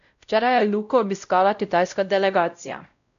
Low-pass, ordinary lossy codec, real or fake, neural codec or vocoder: 7.2 kHz; none; fake; codec, 16 kHz, 0.5 kbps, X-Codec, WavLM features, trained on Multilingual LibriSpeech